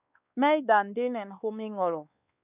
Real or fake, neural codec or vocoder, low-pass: fake; codec, 16 kHz, 2 kbps, X-Codec, WavLM features, trained on Multilingual LibriSpeech; 3.6 kHz